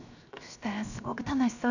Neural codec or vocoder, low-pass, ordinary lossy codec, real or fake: codec, 16 kHz, 0.7 kbps, FocalCodec; 7.2 kHz; none; fake